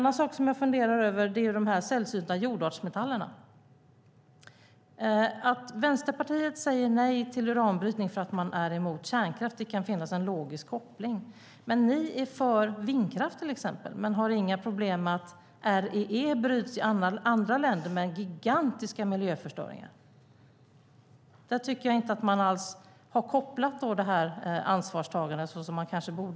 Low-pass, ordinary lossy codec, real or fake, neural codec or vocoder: none; none; real; none